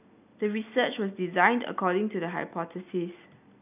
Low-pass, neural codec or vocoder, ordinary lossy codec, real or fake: 3.6 kHz; none; none; real